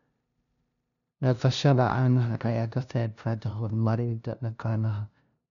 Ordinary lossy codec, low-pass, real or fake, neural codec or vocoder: none; 7.2 kHz; fake; codec, 16 kHz, 0.5 kbps, FunCodec, trained on LibriTTS, 25 frames a second